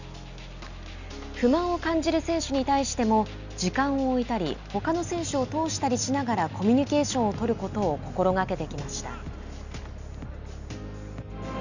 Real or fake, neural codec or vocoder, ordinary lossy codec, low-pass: real; none; none; 7.2 kHz